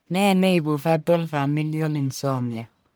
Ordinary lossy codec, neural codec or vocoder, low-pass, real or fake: none; codec, 44.1 kHz, 1.7 kbps, Pupu-Codec; none; fake